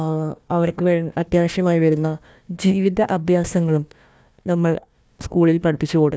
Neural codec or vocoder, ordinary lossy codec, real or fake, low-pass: codec, 16 kHz, 1 kbps, FunCodec, trained on Chinese and English, 50 frames a second; none; fake; none